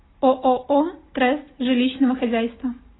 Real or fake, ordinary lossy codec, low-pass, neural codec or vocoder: real; AAC, 16 kbps; 7.2 kHz; none